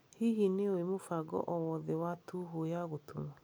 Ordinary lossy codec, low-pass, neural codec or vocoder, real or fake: none; none; none; real